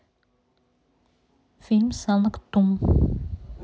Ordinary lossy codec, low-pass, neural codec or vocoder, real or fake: none; none; none; real